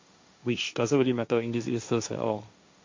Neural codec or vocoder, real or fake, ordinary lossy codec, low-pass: codec, 16 kHz, 1.1 kbps, Voila-Tokenizer; fake; MP3, 48 kbps; 7.2 kHz